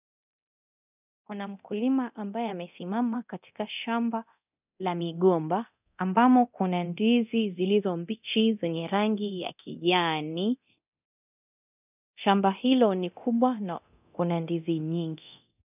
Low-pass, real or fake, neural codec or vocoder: 3.6 kHz; fake; codec, 24 kHz, 0.9 kbps, DualCodec